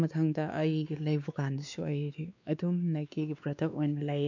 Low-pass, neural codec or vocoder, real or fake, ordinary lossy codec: 7.2 kHz; codec, 16 kHz, 2 kbps, X-Codec, WavLM features, trained on Multilingual LibriSpeech; fake; none